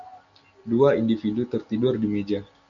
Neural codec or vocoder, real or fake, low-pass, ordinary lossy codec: none; real; 7.2 kHz; MP3, 96 kbps